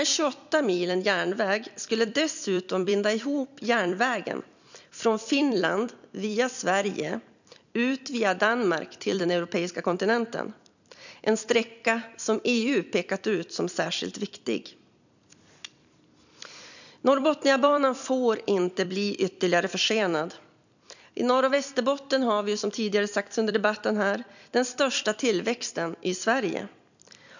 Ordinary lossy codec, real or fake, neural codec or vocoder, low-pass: none; fake; vocoder, 44.1 kHz, 128 mel bands every 256 samples, BigVGAN v2; 7.2 kHz